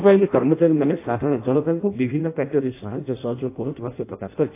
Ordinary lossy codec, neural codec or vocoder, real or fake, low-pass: AAC, 24 kbps; codec, 16 kHz in and 24 kHz out, 0.6 kbps, FireRedTTS-2 codec; fake; 3.6 kHz